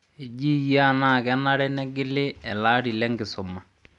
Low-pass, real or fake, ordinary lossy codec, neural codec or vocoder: 10.8 kHz; real; none; none